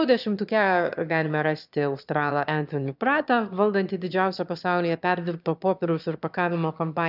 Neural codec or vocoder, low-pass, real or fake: autoencoder, 22.05 kHz, a latent of 192 numbers a frame, VITS, trained on one speaker; 5.4 kHz; fake